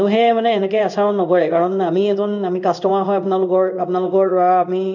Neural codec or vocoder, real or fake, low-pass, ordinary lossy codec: codec, 16 kHz in and 24 kHz out, 1 kbps, XY-Tokenizer; fake; 7.2 kHz; none